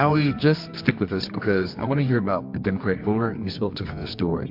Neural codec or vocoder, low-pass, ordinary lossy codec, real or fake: codec, 24 kHz, 0.9 kbps, WavTokenizer, medium music audio release; 5.4 kHz; MP3, 48 kbps; fake